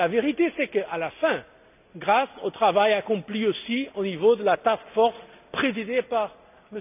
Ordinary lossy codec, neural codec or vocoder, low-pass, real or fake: none; none; 3.6 kHz; real